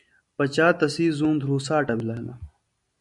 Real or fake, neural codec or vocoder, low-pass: real; none; 10.8 kHz